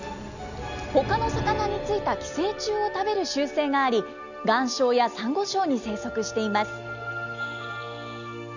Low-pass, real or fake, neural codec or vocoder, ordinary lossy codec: 7.2 kHz; real; none; none